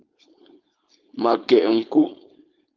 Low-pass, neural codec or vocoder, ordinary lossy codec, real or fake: 7.2 kHz; codec, 16 kHz, 4.8 kbps, FACodec; Opus, 32 kbps; fake